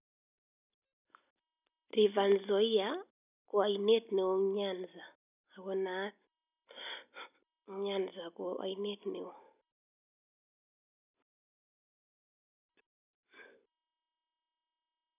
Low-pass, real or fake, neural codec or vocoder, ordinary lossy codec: 3.6 kHz; real; none; none